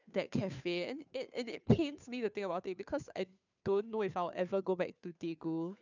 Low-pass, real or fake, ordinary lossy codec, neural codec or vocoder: 7.2 kHz; fake; none; codec, 16 kHz, 2 kbps, FunCodec, trained on Chinese and English, 25 frames a second